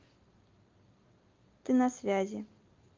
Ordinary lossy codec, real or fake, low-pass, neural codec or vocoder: Opus, 32 kbps; real; 7.2 kHz; none